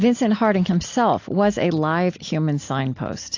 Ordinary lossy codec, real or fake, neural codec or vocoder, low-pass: AAC, 48 kbps; real; none; 7.2 kHz